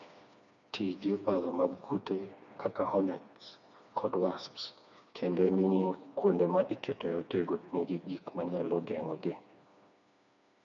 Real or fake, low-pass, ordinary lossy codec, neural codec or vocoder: fake; 7.2 kHz; none; codec, 16 kHz, 2 kbps, FreqCodec, smaller model